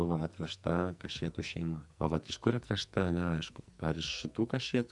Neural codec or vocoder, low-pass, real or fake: codec, 44.1 kHz, 2.6 kbps, SNAC; 10.8 kHz; fake